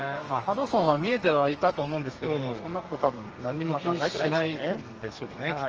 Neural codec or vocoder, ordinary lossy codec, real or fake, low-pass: codec, 32 kHz, 1.9 kbps, SNAC; Opus, 24 kbps; fake; 7.2 kHz